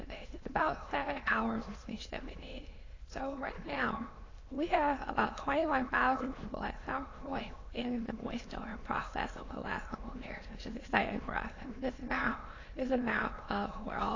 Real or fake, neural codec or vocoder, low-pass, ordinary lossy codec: fake; autoencoder, 22.05 kHz, a latent of 192 numbers a frame, VITS, trained on many speakers; 7.2 kHz; AAC, 32 kbps